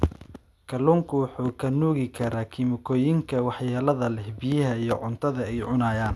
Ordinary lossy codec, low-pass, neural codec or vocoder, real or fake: none; none; none; real